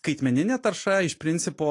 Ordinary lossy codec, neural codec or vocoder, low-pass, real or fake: AAC, 48 kbps; none; 10.8 kHz; real